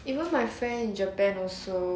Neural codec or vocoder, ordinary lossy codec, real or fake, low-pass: none; none; real; none